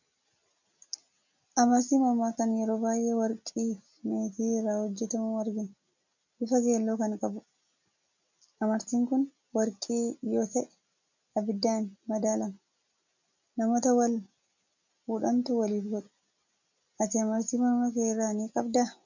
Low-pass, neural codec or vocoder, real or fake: 7.2 kHz; none; real